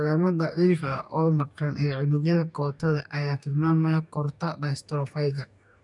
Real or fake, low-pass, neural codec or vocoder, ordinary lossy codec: fake; 10.8 kHz; codec, 44.1 kHz, 2.6 kbps, DAC; none